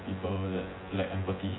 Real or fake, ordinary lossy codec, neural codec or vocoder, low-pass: fake; AAC, 16 kbps; vocoder, 24 kHz, 100 mel bands, Vocos; 7.2 kHz